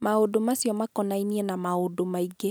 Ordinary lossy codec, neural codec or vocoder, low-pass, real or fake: none; none; none; real